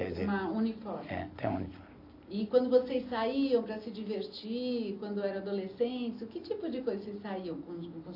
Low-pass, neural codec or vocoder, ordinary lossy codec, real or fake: 5.4 kHz; none; AAC, 32 kbps; real